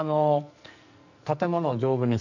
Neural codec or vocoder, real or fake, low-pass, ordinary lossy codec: codec, 44.1 kHz, 2.6 kbps, SNAC; fake; 7.2 kHz; none